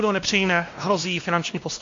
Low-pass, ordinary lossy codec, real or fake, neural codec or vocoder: 7.2 kHz; AAC, 48 kbps; fake; codec, 16 kHz, 1 kbps, X-Codec, WavLM features, trained on Multilingual LibriSpeech